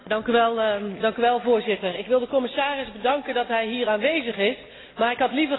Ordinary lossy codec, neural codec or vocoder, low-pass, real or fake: AAC, 16 kbps; none; 7.2 kHz; real